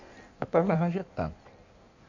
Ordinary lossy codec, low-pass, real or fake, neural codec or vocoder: none; 7.2 kHz; fake; codec, 16 kHz in and 24 kHz out, 1.1 kbps, FireRedTTS-2 codec